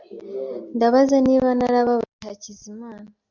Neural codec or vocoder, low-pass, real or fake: none; 7.2 kHz; real